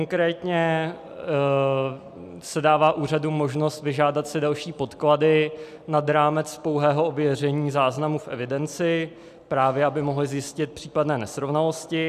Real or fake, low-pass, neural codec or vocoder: real; 14.4 kHz; none